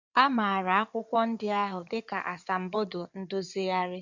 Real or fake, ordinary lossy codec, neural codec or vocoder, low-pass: fake; none; codec, 16 kHz in and 24 kHz out, 2.2 kbps, FireRedTTS-2 codec; 7.2 kHz